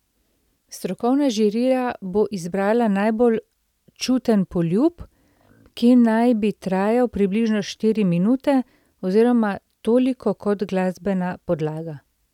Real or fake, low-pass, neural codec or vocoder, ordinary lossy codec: real; 19.8 kHz; none; none